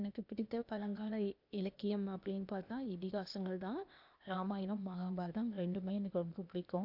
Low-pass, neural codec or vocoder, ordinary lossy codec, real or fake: 5.4 kHz; codec, 16 kHz, 0.8 kbps, ZipCodec; none; fake